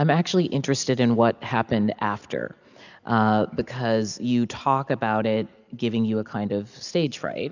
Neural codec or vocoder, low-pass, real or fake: none; 7.2 kHz; real